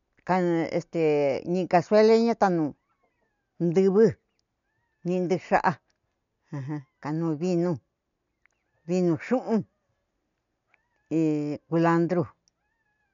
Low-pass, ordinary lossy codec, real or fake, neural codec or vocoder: 7.2 kHz; none; real; none